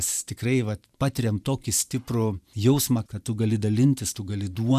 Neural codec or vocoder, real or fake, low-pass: vocoder, 44.1 kHz, 128 mel bands every 512 samples, BigVGAN v2; fake; 14.4 kHz